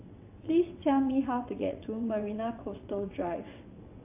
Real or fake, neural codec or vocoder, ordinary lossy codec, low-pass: fake; vocoder, 22.05 kHz, 80 mel bands, WaveNeXt; AAC, 32 kbps; 3.6 kHz